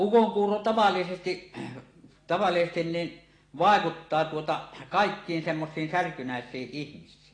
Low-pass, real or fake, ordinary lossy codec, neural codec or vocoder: 9.9 kHz; real; AAC, 32 kbps; none